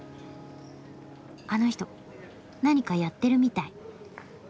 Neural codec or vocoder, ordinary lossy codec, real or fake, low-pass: none; none; real; none